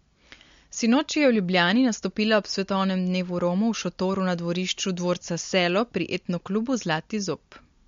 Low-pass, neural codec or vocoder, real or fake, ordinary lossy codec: 7.2 kHz; none; real; MP3, 48 kbps